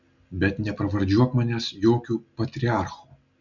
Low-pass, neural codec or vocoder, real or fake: 7.2 kHz; none; real